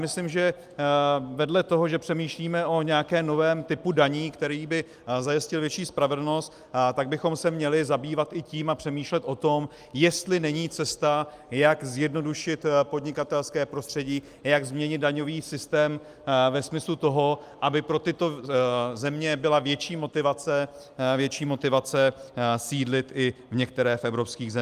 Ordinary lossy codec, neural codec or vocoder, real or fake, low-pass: Opus, 32 kbps; none; real; 14.4 kHz